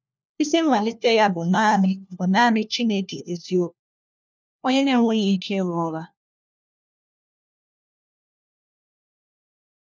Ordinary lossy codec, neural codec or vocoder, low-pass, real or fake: none; codec, 16 kHz, 1 kbps, FunCodec, trained on LibriTTS, 50 frames a second; none; fake